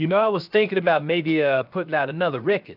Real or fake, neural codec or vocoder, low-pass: fake; codec, 16 kHz, 0.7 kbps, FocalCodec; 5.4 kHz